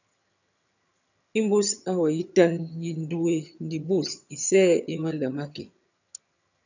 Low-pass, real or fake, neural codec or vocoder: 7.2 kHz; fake; vocoder, 22.05 kHz, 80 mel bands, HiFi-GAN